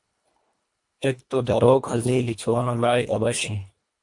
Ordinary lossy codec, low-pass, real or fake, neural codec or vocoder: MP3, 64 kbps; 10.8 kHz; fake; codec, 24 kHz, 1.5 kbps, HILCodec